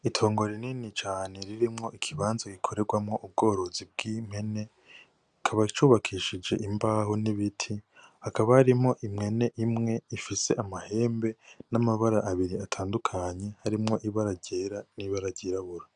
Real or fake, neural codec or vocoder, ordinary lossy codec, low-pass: real; none; Opus, 64 kbps; 9.9 kHz